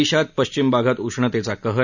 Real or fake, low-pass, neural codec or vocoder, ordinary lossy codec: real; 7.2 kHz; none; none